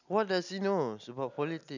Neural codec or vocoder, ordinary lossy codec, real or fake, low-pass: none; none; real; 7.2 kHz